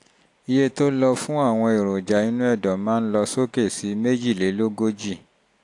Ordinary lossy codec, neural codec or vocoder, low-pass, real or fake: AAC, 64 kbps; none; 10.8 kHz; real